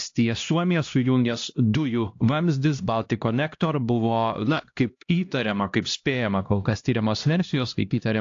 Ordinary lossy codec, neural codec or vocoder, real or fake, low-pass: AAC, 48 kbps; codec, 16 kHz, 1 kbps, X-Codec, HuBERT features, trained on LibriSpeech; fake; 7.2 kHz